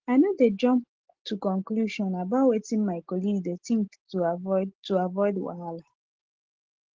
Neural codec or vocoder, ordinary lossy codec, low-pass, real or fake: none; Opus, 16 kbps; 7.2 kHz; real